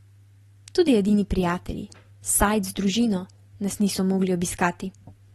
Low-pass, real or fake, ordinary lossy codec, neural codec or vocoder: 19.8 kHz; real; AAC, 32 kbps; none